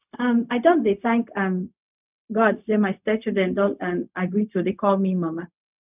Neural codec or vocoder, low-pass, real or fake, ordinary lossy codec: codec, 16 kHz, 0.4 kbps, LongCat-Audio-Codec; 3.6 kHz; fake; none